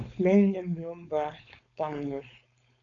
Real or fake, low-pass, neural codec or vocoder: fake; 7.2 kHz; codec, 16 kHz, 4.8 kbps, FACodec